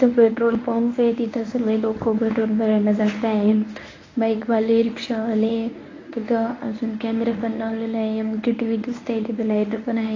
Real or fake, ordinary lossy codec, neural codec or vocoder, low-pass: fake; AAC, 32 kbps; codec, 24 kHz, 0.9 kbps, WavTokenizer, medium speech release version 1; 7.2 kHz